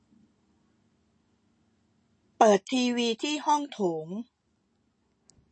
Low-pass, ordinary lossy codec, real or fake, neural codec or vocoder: 9.9 kHz; MP3, 32 kbps; real; none